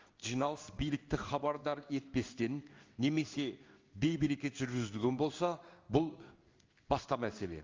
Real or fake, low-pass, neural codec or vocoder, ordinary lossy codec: fake; 7.2 kHz; codec, 16 kHz in and 24 kHz out, 1 kbps, XY-Tokenizer; Opus, 32 kbps